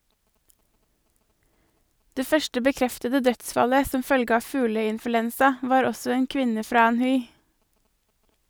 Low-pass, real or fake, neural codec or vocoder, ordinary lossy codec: none; real; none; none